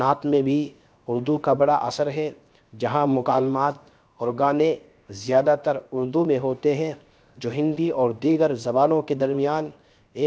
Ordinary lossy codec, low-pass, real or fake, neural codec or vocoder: none; none; fake; codec, 16 kHz, 0.7 kbps, FocalCodec